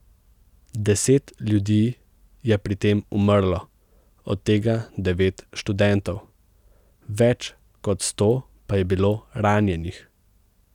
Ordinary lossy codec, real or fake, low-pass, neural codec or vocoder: none; real; 19.8 kHz; none